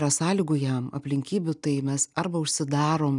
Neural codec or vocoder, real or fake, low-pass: none; real; 10.8 kHz